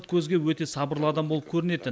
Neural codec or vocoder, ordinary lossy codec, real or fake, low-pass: none; none; real; none